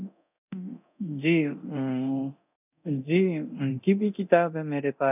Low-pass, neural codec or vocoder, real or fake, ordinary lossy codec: 3.6 kHz; codec, 24 kHz, 0.9 kbps, DualCodec; fake; none